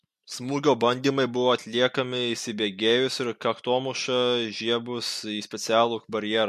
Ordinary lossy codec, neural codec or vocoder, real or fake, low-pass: MP3, 64 kbps; none; real; 14.4 kHz